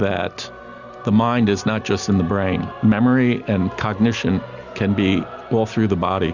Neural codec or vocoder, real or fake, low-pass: none; real; 7.2 kHz